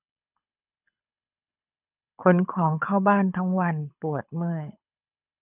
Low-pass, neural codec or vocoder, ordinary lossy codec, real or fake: 3.6 kHz; codec, 24 kHz, 6 kbps, HILCodec; none; fake